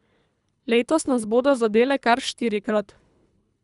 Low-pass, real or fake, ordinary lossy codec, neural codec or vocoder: 10.8 kHz; fake; none; codec, 24 kHz, 3 kbps, HILCodec